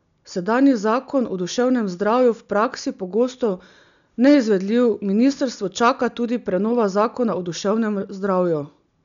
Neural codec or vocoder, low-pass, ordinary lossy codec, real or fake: none; 7.2 kHz; none; real